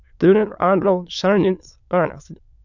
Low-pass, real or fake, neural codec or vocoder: 7.2 kHz; fake; autoencoder, 22.05 kHz, a latent of 192 numbers a frame, VITS, trained on many speakers